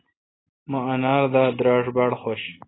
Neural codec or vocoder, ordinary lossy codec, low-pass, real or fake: none; AAC, 16 kbps; 7.2 kHz; real